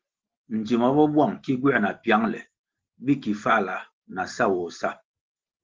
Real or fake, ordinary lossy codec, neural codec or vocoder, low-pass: real; Opus, 16 kbps; none; 7.2 kHz